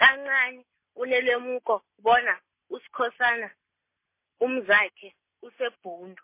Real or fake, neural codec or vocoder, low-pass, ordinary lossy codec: real; none; 3.6 kHz; MP3, 24 kbps